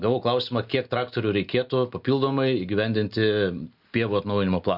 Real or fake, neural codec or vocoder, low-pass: real; none; 5.4 kHz